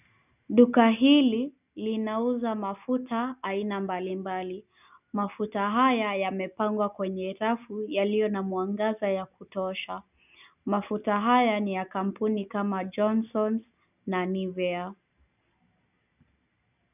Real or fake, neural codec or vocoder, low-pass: real; none; 3.6 kHz